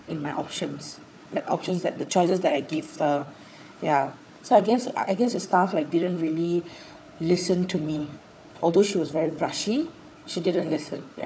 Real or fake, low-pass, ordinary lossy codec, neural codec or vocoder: fake; none; none; codec, 16 kHz, 4 kbps, FunCodec, trained on Chinese and English, 50 frames a second